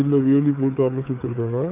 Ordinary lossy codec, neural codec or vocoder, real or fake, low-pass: none; codec, 16 kHz, 4 kbps, FreqCodec, larger model; fake; 3.6 kHz